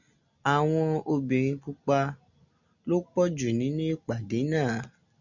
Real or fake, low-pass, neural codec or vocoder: real; 7.2 kHz; none